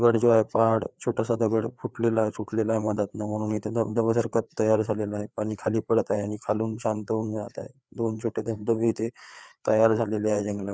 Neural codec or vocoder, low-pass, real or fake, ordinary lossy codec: codec, 16 kHz, 4 kbps, FreqCodec, larger model; none; fake; none